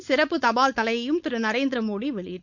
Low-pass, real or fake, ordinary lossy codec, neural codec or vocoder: 7.2 kHz; fake; none; codec, 16 kHz, 4.8 kbps, FACodec